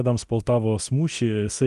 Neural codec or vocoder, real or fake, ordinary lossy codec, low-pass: codec, 24 kHz, 0.9 kbps, DualCodec; fake; Opus, 24 kbps; 10.8 kHz